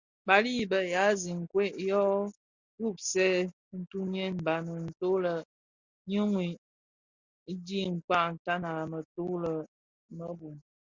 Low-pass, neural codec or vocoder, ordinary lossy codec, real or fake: 7.2 kHz; none; Opus, 64 kbps; real